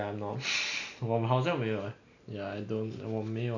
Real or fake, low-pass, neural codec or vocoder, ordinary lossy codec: real; 7.2 kHz; none; none